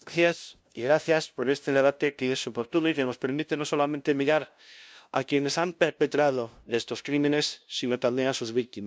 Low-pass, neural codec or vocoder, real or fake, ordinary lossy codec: none; codec, 16 kHz, 0.5 kbps, FunCodec, trained on LibriTTS, 25 frames a second; fake; none